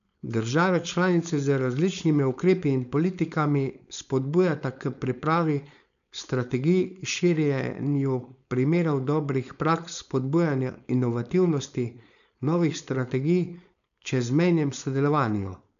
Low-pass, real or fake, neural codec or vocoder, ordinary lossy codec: 7.2 kHz; fake; codec, 16 kHz, 4.8 kbps, FACodec; none